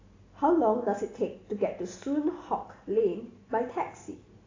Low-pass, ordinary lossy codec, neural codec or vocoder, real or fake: 7.2 kHz; AAC, 32 kbps; none; real